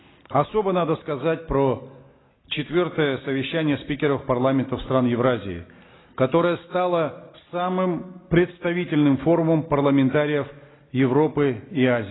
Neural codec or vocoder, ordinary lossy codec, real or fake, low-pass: none; AAC, 16 kbps; real; 7.2 kHz